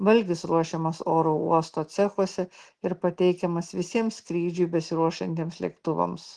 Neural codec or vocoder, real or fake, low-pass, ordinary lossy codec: none; real; 10.8 kHz; Opus, 16 kbps